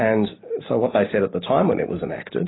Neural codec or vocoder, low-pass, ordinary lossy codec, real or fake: none; 7.2 kHz; AAC, 16 kbps; real